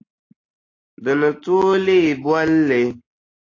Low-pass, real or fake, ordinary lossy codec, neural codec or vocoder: 7.2 kHz; real; AAC, 32 kbps; none